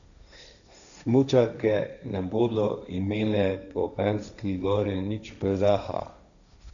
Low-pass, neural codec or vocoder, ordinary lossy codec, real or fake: 7.2 kHz; codec, 16 kHz, 1.1 kbps, Voila-Tokenizer; none; fake